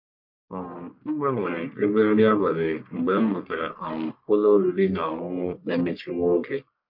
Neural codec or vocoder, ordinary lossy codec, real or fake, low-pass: codec, 44.1 kHz, 1.7 kbps, Pupu-Codec; MP3, 48 kbps; fake; 5.4 kHz